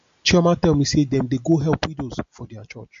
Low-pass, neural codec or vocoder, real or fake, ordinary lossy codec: 7.2 kHz; none; real; MP3, 48 kbps